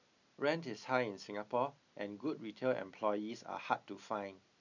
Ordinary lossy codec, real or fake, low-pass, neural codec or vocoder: none; real; 7.2 kHz; none